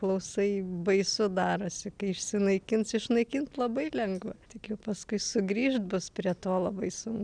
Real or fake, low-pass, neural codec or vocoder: real; 9.9 kHz; none